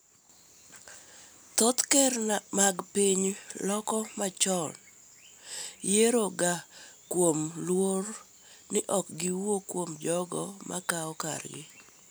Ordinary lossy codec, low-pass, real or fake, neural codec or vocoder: none; none; real; none